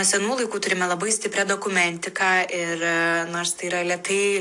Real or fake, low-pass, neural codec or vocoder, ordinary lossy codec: real; 10.8 kHz; none; AAC, 64 kbps